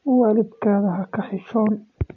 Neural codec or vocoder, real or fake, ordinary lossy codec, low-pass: none; real; none; 7.2 kHz